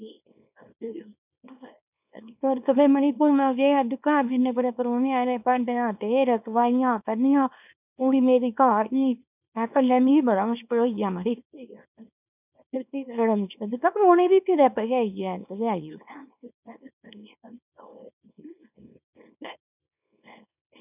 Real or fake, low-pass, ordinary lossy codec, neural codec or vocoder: fake; 3.6 kHz; none; codec, 24 kHz, 0.9 kbps, WavTokenizer, small release